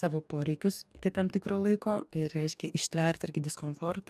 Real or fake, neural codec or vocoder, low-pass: fake; codec, 44.1 kHz, 2.6 kbps, DAC; 14.4 kHz